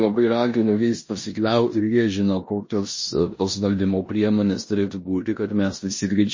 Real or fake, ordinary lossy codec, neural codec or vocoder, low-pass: fake; MP3, 32 kbps; codec, 16 kHz in and 24 kHz out, 0.9 kbps, LongCat-Audio-Codec, four codebook decoder; 7.2 kHz